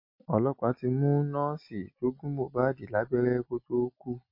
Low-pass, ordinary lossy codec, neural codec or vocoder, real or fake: 5.4 kHz; MP3, 32 kbps; none; real